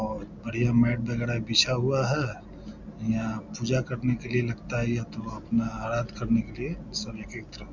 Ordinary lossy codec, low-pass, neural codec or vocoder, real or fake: none; 7.2 kHz; none; real